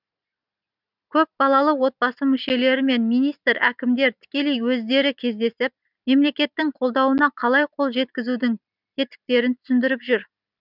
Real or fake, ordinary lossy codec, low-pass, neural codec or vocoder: real; none; 5.4 kHz; none